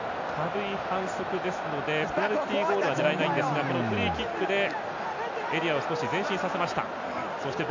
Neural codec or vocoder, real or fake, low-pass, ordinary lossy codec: none; real; 7.2 kHz; MP3, 64 kbps